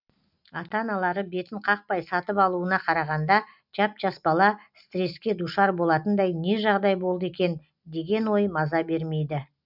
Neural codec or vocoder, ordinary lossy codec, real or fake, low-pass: none; none; real; 5.4 kHz